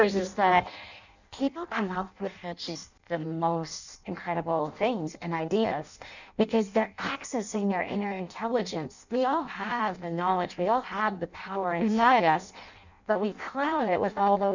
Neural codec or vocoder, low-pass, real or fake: codec, 16 kHz in and 24 kHz out, 0.6 kbps, FireRedTTS-2 codec; 7.2 kHz; fake